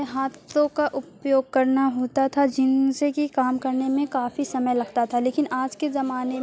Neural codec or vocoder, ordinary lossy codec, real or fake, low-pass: none; none; real; none